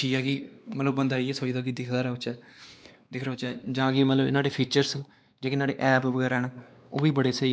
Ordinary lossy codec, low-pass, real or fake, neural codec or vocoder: none; none; fake; codec, 16 kHz, 2 kbps, X-Codec, WavLM features, trained on Multilingual LibriSpeech